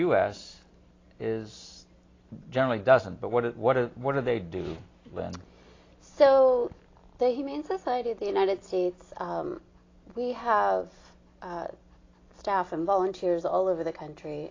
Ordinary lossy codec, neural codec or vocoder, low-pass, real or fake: AAC, 32 kbps; none; 7.2 kHz; real